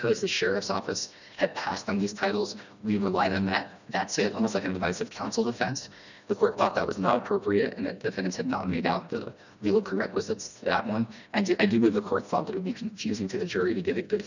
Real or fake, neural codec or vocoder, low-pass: fake; codec, 16 kHz, 1 kbps, FreqCodec, smaller model; 7.2 kHz